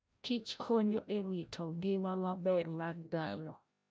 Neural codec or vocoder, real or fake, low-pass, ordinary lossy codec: codec, 16 kHz, 0.5 kbps, FreqCodec, larger model; fake; none; none